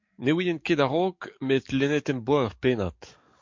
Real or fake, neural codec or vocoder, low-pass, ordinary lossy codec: fake; codec, 44.1 kHz, 7.8 kbps, DAC; 7.2 kHz; MP3, 48 kbps